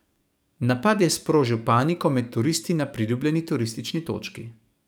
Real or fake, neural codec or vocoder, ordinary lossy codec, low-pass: fake; codec, 44.1 kHz, 7.8 kbps, DAC; none; none